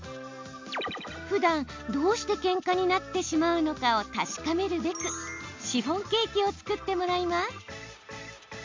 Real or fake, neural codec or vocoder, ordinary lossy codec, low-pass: real; none; MP3, 64 kbps; 7.2 kHz